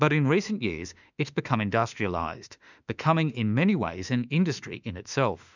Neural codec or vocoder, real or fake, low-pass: autoencoder, 48 kHz, 32 numbers a frame, DAC-VAE, trained on Japanese speech; fake; 7.2 kHz